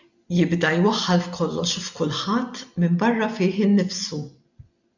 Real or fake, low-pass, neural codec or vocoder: real; 7.2 kHz; none